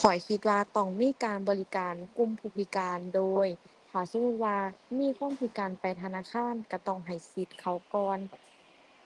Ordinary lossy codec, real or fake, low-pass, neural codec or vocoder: Opus, 24 kbps; real; 10.8 kHz; none